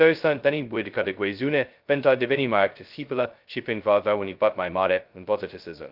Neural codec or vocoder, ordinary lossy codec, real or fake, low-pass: codec, 16 kHz, 0.2 kbps, FocalCodec; Opus, 32 kbps; fake; 5.4 kHz